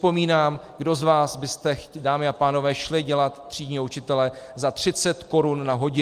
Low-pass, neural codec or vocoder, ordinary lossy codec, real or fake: 14.4 kHz; none; Opus, 32 kbps; real